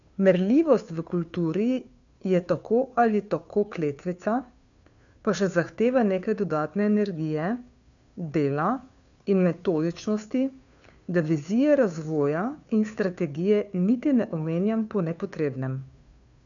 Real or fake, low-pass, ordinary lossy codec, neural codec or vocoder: fake; 7.2 kHz; none; codec, 16 kHz, 2 kbps, FunCodec, trained on Chinese and English, 25 frames a second